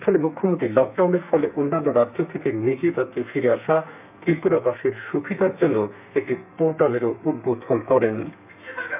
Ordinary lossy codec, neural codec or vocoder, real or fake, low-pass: none; codec, 32 kHz, 1.9 kbps, SNAC; fake; 3.6 kHz